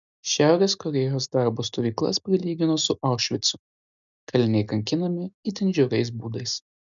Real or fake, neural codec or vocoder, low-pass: real; none; 7.2 kHz